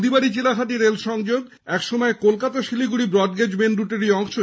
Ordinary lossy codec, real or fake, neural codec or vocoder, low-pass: none; real; none; none